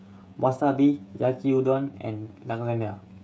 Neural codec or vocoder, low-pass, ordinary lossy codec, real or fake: codec, 16 kHz, 16 kbps, FreqCodec, smaller model; none; none; fake